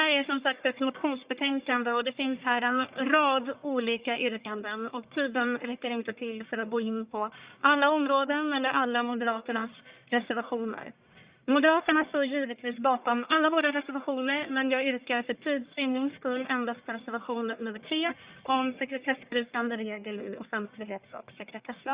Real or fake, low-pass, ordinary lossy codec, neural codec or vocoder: fake; 3.6 kHz; Opus, 64 kbps; codec, 44.1 kHz, 1.7 kbps, Pupu-Codec